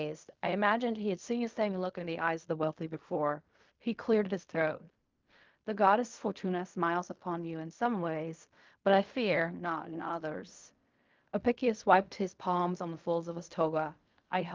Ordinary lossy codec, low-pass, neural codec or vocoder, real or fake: Opus, 24 kbps; 7.2 kHz; codec, 16 kHz in and 24 kHz out, 0.4 kbps, LongCat-Audio-Codec, fine tuned four codebook decoder; fake